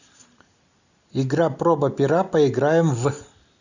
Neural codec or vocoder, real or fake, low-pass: none; real; 7.2 kHz